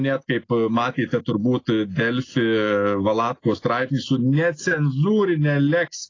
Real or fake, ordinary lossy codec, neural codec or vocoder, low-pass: real; AAC, 32 kbps; none; 7.2 kHz